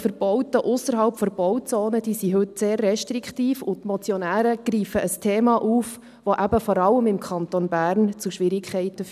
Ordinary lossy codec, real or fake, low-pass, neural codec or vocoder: none; real; 14.4 kHz; none